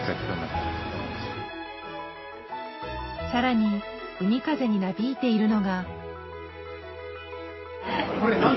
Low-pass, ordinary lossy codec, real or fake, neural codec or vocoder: 7.2 kHz; MP3, 24 kbps; real; none